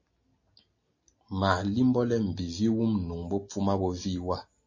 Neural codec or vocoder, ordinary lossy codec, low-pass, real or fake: none; MP3, 32 kbps; 7.2 kHz; real